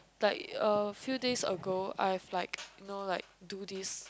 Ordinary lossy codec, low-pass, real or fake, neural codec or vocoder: none; none; real; none